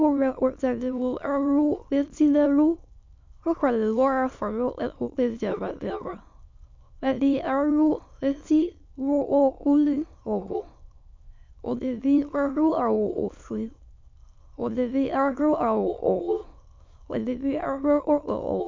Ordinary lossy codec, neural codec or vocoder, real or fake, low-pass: MP3, 64 kbps; autoencoder, 22.05 kHz, a latent of 192 numbers a frame, VITS, trained on many speakers; fake; 7.2 kHz